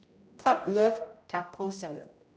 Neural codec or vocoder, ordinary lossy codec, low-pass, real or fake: codec, 16 kHz, 0.5 kbps, X-Codec, HuBERT features, trained on general audio; none; none; fake